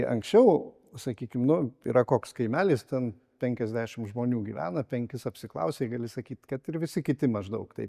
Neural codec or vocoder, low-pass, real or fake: none; 14.4 kHz; real